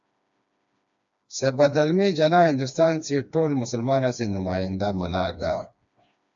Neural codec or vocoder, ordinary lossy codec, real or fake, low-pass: codec, 16 kHz, 2 kbps, FreqCodec, smaller model; AAC, 64 kbps; fake; 7.2 kHz